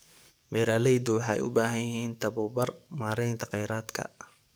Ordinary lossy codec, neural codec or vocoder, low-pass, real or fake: none; codec, 44.1 kHz, 7.8 kbps, DAC; none; fake